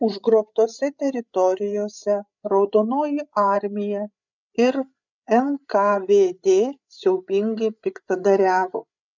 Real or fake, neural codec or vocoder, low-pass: fake; codec, 16 kHz, 8 kbps, FreqCodec, larger model; 7.2 kHz